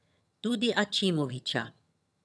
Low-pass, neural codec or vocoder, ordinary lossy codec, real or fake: none; vocoder, 22.05 kHz, 80 mel bands, HiFi-GAN; none; fake